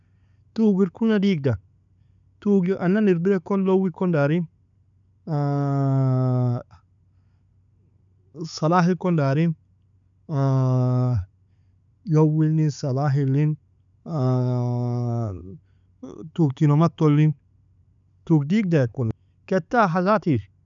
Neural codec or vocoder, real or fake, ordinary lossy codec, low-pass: none; real; none; 7.2 kHz